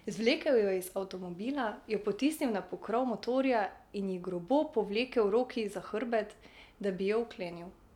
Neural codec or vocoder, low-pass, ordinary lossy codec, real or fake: none; 19.8 kHz; none; real